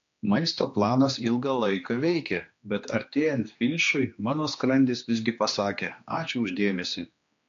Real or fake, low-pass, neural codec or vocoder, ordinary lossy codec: fake; 7.2 kHz; codec, 16 kHz, 2 kbps, X-Codec, HuBERT features, trained on general audio; AAC, 48 kbps